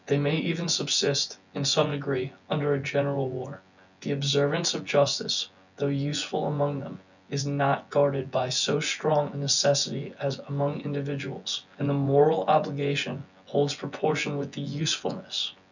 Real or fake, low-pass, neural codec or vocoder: fake; 7.2 kHz; vocoder, 24 kHz, 100 mel bands, Vocos